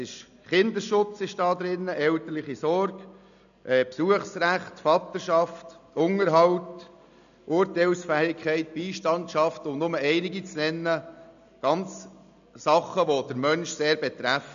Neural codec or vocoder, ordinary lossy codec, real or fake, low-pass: none; none; real; 7.2 kHz